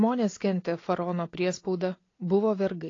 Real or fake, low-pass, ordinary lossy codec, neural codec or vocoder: real; 7.2 kHz; AAC, 32 kbps; none